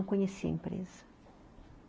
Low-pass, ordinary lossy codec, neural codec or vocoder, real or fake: none; none; none; real